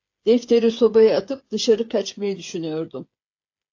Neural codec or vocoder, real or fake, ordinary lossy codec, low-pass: codec, 16 kHz, 8 kbps, FreqCodec, smaller model; fake; AAC, 48 kbps; 7.2 kHz